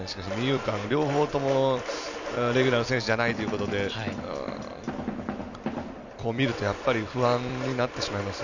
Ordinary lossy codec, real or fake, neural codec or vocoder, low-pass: none; fake; vocoder, 44.1 kHz, 128 mel bands every 256 samples, BigVGAN v2; 7.2 kHz